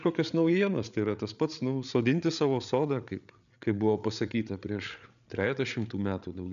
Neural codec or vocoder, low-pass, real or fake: codec, 16 kHz, 8 kbps, FreqCodec, larger model; 7.2 kHz; fake